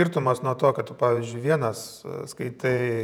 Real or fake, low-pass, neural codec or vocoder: fake; 19.8 kHz; vocoder, 48 kHz, 128 mel bands, Vocos